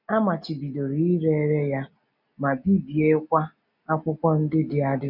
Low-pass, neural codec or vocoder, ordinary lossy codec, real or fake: 5.4 kHz; none; none; real